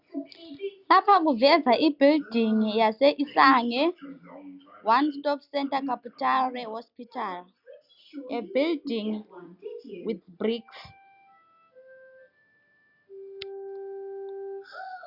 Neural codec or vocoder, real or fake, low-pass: none; real; 5.4 kHz